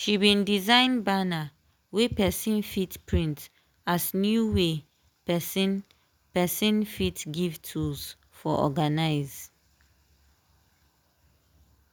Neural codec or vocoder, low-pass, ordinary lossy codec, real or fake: none; none; none; real